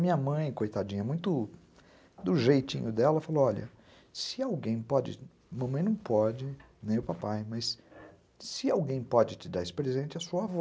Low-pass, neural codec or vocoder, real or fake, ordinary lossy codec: none; none; real; none